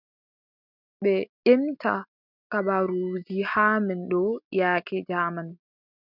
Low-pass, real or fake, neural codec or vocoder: 5.4 kHz; real; none